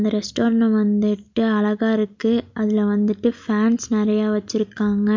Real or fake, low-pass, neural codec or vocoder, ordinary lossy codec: real; 7.2 kHz; none; MP3, 64 kbps